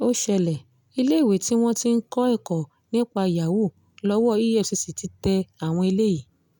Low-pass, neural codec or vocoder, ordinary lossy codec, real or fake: none; none; none; real